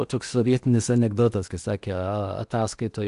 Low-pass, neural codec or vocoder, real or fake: 10.8 kHz; codec, 16 kHz in and 24 kHz out, 0.8 kbps, FocalCodec, streaming, 65536 codes; fake